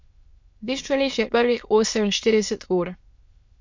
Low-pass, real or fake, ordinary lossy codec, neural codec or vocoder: 7.2 kHz; fake; MP3, 48 kbps; autoencoder, 22.05 kHz, a latent of 192 numbers a frame, VITS, trained on many speakers